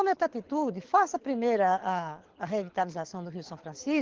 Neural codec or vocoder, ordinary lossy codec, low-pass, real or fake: codec, 24 kHz, 6 kbps, HILCodec; Opus, 16 kbps; 7.2 kHz; fake